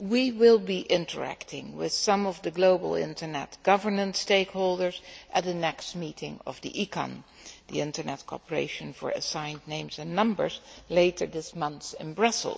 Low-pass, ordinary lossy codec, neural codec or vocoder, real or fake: none; none; none; real